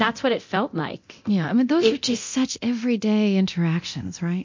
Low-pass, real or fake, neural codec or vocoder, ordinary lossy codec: 7.2 kHz; fake; codec, 24 kHz, 0.9 kbps, DualCodec; MP3, 48 kbps